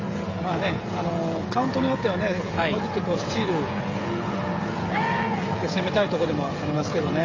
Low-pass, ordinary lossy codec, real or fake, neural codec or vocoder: 7.2 kHz; AAC, 32 kbps; fake; codec, 16 kHz, 16 kbps, FreqCodec, smaller model